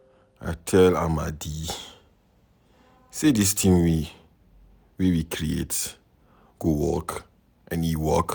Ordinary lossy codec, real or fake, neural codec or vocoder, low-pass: none; real; none; none